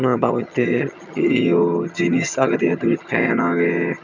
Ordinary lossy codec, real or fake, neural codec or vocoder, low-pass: none; fake; vocoder, 22.05 kHz, 80 mel bands, HiFi-GAN; 7.2 kHz